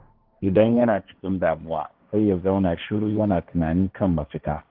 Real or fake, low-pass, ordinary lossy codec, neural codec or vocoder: fake; 5.4 kHz; Opus, 32 kbps; codec, 16 kHz, 1.1 kbps, Voila-Tokenizer